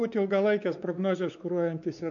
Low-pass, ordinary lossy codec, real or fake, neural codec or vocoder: 7.2 kHz; MP3, 96 kbps; fake; codec, 16 kHz, 4 kbps, X-Codec, WavLM features, trained on Multilingual LibriSpeech